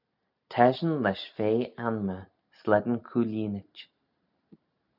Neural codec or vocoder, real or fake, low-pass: none; real; 5.4 kHz